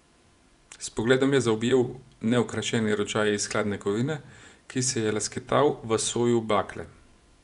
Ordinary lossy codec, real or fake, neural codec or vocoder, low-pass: none; fake; vocoder, 24 kHz, 100 mel bands, Vocos; 10.8 kHz